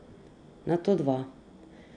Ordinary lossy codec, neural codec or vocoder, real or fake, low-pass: none; none; real; 9.9 kHz